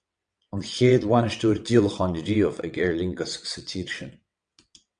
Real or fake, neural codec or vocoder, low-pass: fake; vocoder, 22.05 kHz, 80 mel bands, WaveNeXt; 9.9 kHz